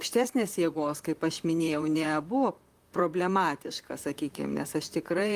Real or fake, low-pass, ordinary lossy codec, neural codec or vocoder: fake; 14.4 kHz; Opus, 32 kbps; vocoder, 44.1 kHz, 128 mel bands, Pupu-Vocoder